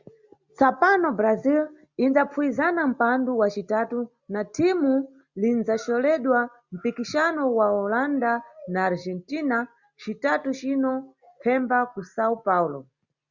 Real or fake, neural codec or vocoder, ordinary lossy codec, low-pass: real; none; Opus, 64 kbps; 7.2 kHz